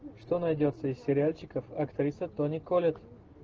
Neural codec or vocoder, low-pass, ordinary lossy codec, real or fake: none; 7.2 kHz; Opus, 16 kbps; real